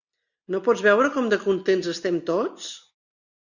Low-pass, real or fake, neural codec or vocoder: 7.2 kHz; real; none